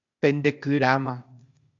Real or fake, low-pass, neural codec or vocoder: fake; 7.2 kHz; codec, 16 kHz, 0.8 kbps, ZipCodec